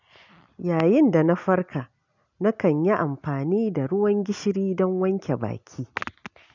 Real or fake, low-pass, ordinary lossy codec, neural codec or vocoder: real; 7.2 kHz; none; none